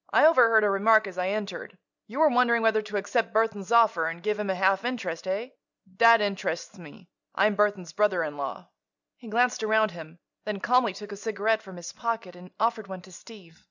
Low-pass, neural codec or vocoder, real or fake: 7.2 kHz; none; real